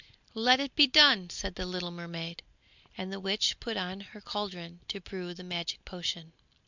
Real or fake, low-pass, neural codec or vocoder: real; 7.2 kHz; none